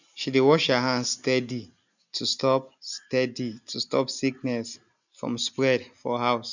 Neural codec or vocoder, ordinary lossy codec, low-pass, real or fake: none; none; 7.2 kHz; real